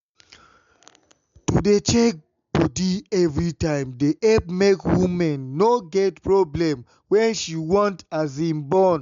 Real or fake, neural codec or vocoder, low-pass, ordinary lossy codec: real; none; 7.2 kHz; MP3, 64 kbps